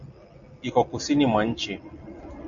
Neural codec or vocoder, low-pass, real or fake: none; 7.2 kHz; real